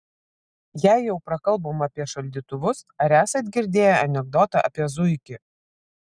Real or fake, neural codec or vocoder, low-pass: real; none; 9.9 kHz